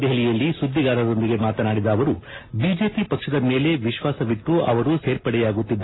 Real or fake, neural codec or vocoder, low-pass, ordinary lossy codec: real; none; 7.2 kHz; AAC, 16 kbps